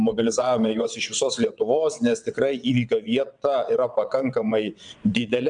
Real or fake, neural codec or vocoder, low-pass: fake; vocoder, 22.05 kHz, 80 mel bands, WaveNeXt; 9.9 kHz